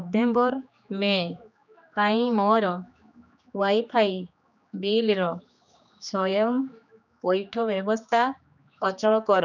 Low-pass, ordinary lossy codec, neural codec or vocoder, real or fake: 7.2 kHz; none; codec, 16 kHz, 2 kbps, X-Codec, HuBERT features, trained on general audio; fake